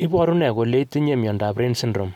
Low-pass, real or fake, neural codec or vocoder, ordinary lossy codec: 19.8 kHz; real; none; none